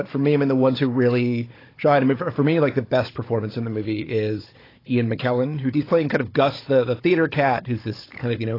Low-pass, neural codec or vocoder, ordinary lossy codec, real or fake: 5.4 kHz; codec, 16 kHz, 16 kbps, FunCodec, trained on Chinese and English, 50 frames a second; AAC, 24 kbps; fake